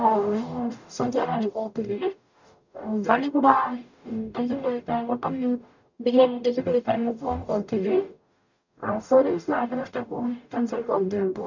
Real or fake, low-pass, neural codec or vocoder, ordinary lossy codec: fake; 7.2 kHz; codec, 44.1 kHz, 0.9 kbps, DAC; none